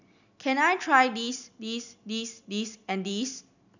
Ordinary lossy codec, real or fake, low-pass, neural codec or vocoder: none; real; 7.2 kHz; none